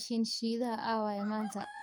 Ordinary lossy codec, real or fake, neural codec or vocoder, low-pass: none; fake; codec, 44.1 kHz, 7.8 kbps, DAC; none